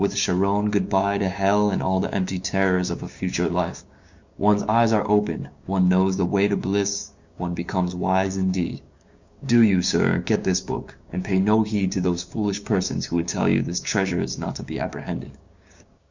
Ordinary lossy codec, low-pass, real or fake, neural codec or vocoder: Opus, 64 kbps; 7.2 kHz; fake; codec, 16 kHz, 6 kbps, DAC